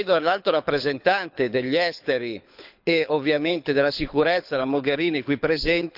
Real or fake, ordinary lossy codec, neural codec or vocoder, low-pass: fake; none; codec, 24 kHz, 6 kbps, HILCodec; 5.4 kHz